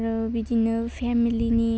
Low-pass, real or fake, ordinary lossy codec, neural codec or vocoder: none; real; none; none